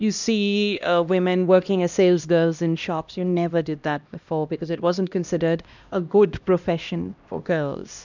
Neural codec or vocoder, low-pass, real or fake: codec, 16 kHz, 1 kbps, X-Codec, HuBERT features, trained on LibriSpeech; 7.2 kHz; fake